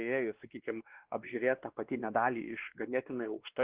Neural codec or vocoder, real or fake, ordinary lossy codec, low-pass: codec, 16 kHz, 2 kbps, X-Codec, WavLM features, trained on Multilingual LibriSpeech; fake; Opus, 16 kbps; 3.6 kHz